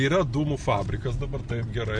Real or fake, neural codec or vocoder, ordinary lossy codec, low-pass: real; none; MP3, 64 kbps; 9.9 kHz